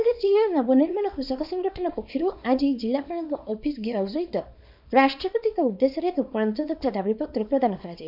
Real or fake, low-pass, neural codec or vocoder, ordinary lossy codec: fake; 5.4 kHz; codec, 24 kHz, 0.9 kbps, WavTokenizer, small release; none